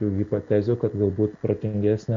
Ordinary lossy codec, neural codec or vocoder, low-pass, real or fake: MP3, 48 kbps; none; 7.2 kHz; real